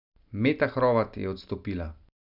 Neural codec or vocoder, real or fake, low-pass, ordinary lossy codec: none; real; 5.4 kHz; none